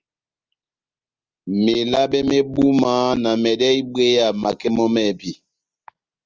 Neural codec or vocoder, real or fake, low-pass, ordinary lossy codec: none; real; 7.2 kHz; Opus, 32 kbps